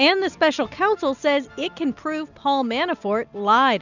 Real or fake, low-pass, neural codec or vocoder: real; 7.2 kHz; none